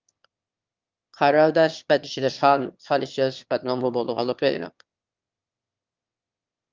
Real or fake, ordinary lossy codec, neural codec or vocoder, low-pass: fake; Opus, 24 kbps; autoencoder, 22.05 kHz, a latent of 192 numbers a frame, VITS, trained on one speaker; 7.2 kHz